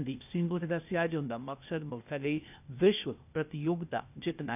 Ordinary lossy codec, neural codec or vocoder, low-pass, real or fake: none; codec, 16 kHz, 0.8 kbps, ZipCodec; 3.6 kHz; fake